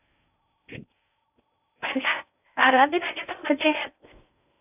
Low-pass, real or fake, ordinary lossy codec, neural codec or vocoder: 3.6 kHz; fake; none; codec, 16 kHz in and 24 kHz out, 0.6 kbps, FocalCodec, streaming, 4096 codes